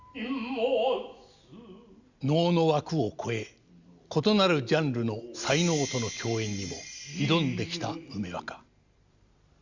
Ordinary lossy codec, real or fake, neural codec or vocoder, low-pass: Opus, 64 kbps; real; none; 7.2 kHz